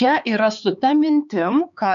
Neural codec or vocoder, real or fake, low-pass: codec, 16 kHz, 4 kbps, X-Codec, HuBERT features, trained on LibriSpeech; fake; 7.2 kHz